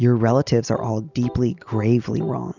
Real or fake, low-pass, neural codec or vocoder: real; 7.2 kHz; none